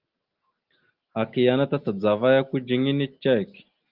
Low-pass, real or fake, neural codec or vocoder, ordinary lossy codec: 5.4 kHz; real; none; Opus, 32 kbps